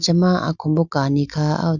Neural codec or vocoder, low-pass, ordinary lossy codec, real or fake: none; 7.2 kHz; none; real